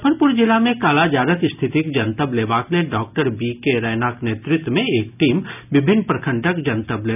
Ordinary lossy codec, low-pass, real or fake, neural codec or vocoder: none; 3.6 kHz; real; none